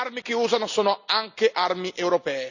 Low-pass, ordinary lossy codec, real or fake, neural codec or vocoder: 7.2 kHz; none; real; none